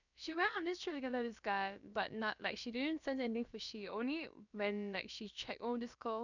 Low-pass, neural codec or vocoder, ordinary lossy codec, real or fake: 7.2 kHz; codec, 16 kHz, about 1 kbps, DyCAST, with the encoder's durations; none; fake